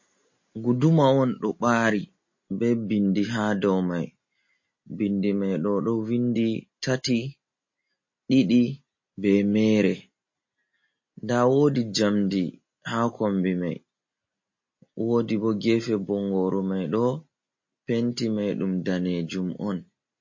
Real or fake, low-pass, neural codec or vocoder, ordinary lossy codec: real; 7.2 kHz; none; MP3, 32 kbps